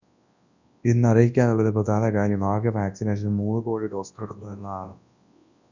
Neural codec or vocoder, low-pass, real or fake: codec, 24 kHz, 0.9 kbps, WavTokenizer, large speech release; 7.2 kHz; fake